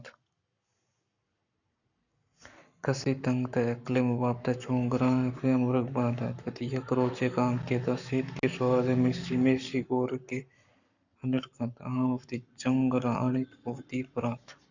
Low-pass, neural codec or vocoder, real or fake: 7.2 kHz; codec, 44.1 kHz, 7.8 kbps, Pupu-Codec; fake